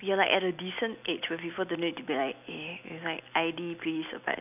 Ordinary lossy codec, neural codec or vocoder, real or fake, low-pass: none; none; real; 3.6 kHz